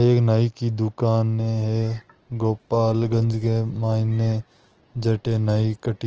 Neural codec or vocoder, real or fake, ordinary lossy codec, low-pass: none; real; Opus, 16 kbps; 7.2 kHz